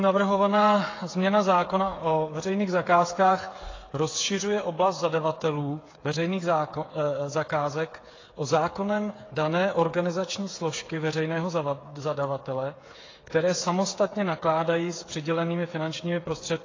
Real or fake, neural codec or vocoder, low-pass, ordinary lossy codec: fake; codec, 16 kHz, 8 kbps, FreqCodec, smaller model; 7.2 kHz; AAC, 32 kbps